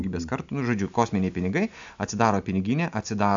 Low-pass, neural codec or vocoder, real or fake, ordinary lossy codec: 7.2 kHz; none; real; AAC, 64 kbps